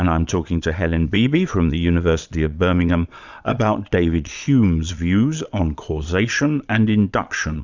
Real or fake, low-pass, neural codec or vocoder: fake; 7.2 kHz; vocoder, 22.05 kHz, 80 mel bands, Vocos